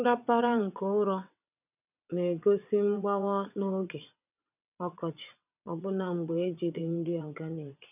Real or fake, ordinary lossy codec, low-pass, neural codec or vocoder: fake; none; 3.6 kHz; vocoder, 22.05 kHz, 80 mel bands, WaveNeXt